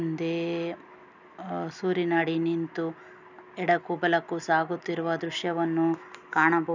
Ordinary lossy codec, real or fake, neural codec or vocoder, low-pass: none; real; none; 7.2 kHz